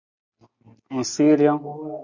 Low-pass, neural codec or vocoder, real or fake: 7.2 kHz; none; real